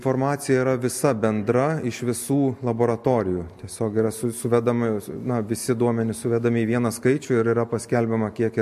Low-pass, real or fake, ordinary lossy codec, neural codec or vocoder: 14.4 kHz; real; MP3, 64 kbps; none